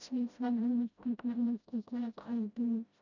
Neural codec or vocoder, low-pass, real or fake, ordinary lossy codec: codec, 16 kHz, 0.5 kbps, FreqCodec, smaller model; 7.2 kHz; fake; none